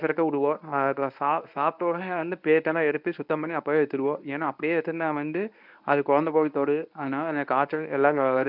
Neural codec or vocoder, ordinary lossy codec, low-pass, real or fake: codec, 24 kHz, 0.9 kbps, WavTokenizer, medium speech release version 1; none; 5.4 kHz; fake